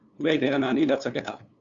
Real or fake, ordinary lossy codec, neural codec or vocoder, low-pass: fake; Opus, 64 kbps; codec, 16 kHz, 2 kbps, FunCodec, trained on LibriTTS, 25 frames a second; 7.2 kHz